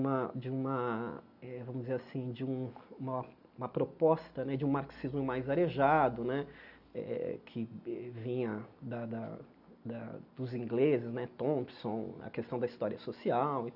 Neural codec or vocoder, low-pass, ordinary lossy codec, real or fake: none; 5.4 kHz; none; real